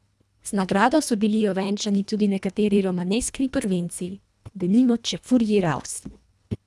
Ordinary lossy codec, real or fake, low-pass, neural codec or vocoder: none; fake; none; codec, 24 kHz, 1.5 kbps, HILCodec